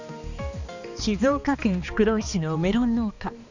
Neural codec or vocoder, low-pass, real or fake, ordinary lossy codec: codec, 16 kHz, 2 kbps, X-Codec, HuBERT features, trained on balanced general audio; 7.2 kHz; fake; none